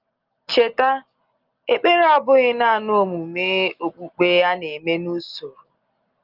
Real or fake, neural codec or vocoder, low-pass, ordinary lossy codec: real; none; 5.4 kHz; Opus, 32 kbps